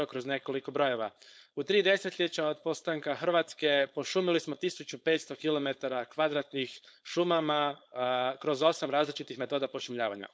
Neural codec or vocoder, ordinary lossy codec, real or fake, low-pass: codec, 16 kHz, 4.8 kbps, FACodec; none; fake; none